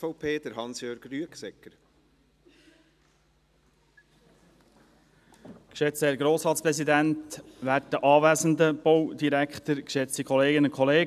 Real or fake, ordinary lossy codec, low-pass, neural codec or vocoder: real; none; 14.4 kHz; none